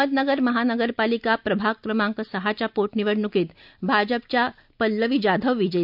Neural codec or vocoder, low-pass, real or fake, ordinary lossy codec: none; 5.4 kHz; real; none